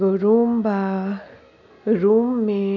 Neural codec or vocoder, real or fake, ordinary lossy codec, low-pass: none; real; none; 7.2 kHz